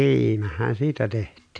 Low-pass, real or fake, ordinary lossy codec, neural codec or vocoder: 9.9 kHz; real; AAC, 64 kbps; none